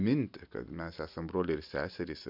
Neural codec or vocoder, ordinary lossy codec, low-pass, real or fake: none; MP3, 48 kbps; 5.4 kHz; real